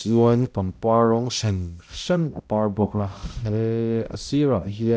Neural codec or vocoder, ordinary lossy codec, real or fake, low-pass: codec, 16 kHz, 0.5 kbps, X-Codec, HuBERT features, trained on balanced general audio; none; fake; none